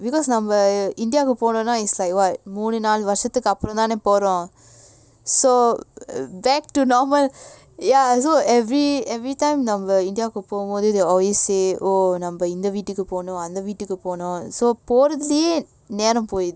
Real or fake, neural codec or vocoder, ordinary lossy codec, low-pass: real; none; none; none